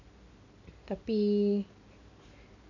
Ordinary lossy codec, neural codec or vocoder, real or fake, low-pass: none; none; real; 7.2 kHz